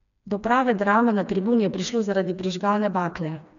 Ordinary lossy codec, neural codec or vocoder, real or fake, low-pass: none; codec, 16 kHz, 2 kbps, FreqCodec, smaller model; fake; 7.2 kHz